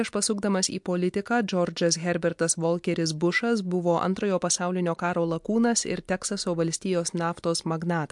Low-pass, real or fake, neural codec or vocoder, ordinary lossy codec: 10.8 kHz; real; none; MP3, 64 kbps